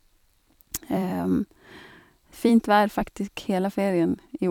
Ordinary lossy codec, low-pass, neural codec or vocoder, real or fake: none; 19.8 kHz; vocoder, 44.1 kHz, 128 mel bands every 256 samples, BigVGAN v2; fake